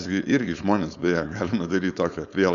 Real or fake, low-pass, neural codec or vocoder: fake; 7.2 kHz; codec, 16 kHz, 4.8 kbps, FACodec